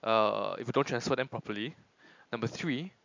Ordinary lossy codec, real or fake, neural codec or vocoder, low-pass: MP3, 64 kbps; real; none; 7.2 kHz